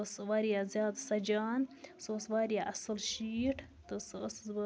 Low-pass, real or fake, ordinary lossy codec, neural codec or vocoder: none; real; none; none